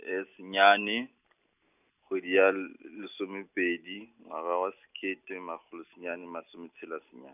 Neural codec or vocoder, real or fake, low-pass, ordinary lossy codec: none; real; 3.6 kHz; none